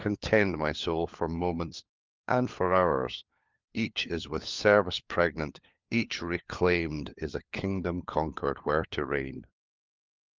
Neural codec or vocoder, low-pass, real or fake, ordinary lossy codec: codec, 16 kHz, 4 kbps, FunCodec, trained on LibriTTS, 50 frames a second; 7.2 kHz; fake; Opus, 32 kbps